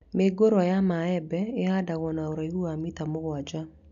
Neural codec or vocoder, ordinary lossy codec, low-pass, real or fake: none; none; 7.2 kHz; real